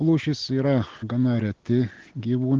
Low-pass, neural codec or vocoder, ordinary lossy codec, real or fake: 7.2 kHz; none; Opus, 16 kbps; real